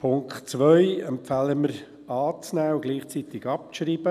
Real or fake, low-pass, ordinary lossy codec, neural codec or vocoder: real; 14.4 kHz; none; none